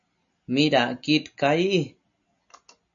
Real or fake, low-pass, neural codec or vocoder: real; 7.2 kHz; none